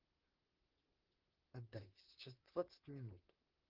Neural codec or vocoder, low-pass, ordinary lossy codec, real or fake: vocoder, 44.1 kHz, 128 mel bands, Pupu-Vocoder; 5.4 kHz; Opus, 16 kbps; fake